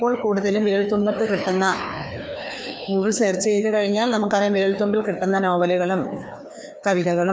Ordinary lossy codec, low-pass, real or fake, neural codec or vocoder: none; none; fake; codec, 16 kHz, 2 kbps, FreqCodec, larger model